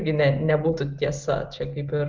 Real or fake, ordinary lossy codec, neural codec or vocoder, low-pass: real; Opus, 24 kbps; none; 7.2 kHz